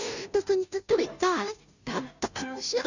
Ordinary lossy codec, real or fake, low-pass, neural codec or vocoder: none; fake; 7.2 kHz; codec, 16 kHz, 0.5 kbps, FunCodec, trained on Chinese and English, 25 frames a second